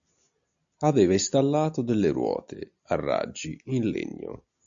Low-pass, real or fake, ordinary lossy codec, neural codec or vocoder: 7.2 kHz; real; AAC, 64 kbps; none